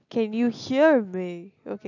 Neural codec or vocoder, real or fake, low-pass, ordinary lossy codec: none; real; 7.2 kHz; none